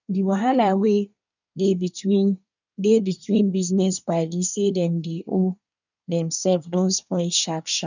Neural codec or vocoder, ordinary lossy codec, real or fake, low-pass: codec, 24 kHz, 1 kbps, SNAC; none; fake; 7.2 kHz